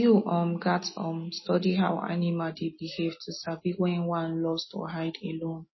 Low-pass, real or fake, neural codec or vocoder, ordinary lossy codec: 7.2 kHz; real; none; MP3, 24 kbps